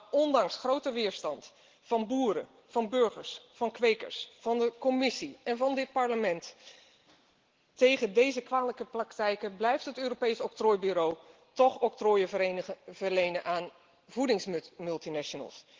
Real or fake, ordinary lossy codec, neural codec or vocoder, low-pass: real; Opus, 16 kbps; none; 7.2 kHz